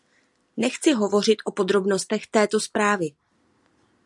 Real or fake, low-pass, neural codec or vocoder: real; 10.8 kHz; none